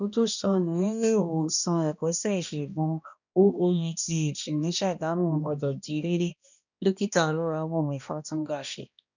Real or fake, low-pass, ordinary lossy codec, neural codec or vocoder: fake; 7.2 kHz; none; codec, 16 kHz, 1 kbps, X-Codec, HuBERT features, trained on balanced general audio